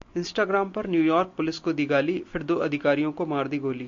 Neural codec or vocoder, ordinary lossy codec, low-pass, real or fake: none; MP3, 48 kbps; 7.2 kHz; real